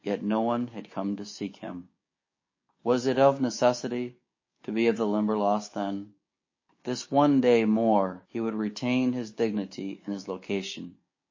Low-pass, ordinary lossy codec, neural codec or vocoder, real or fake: 7.2 kHz; MP3, 32 kbps; codec, 16 kHz, 6 kbps, DAC; fake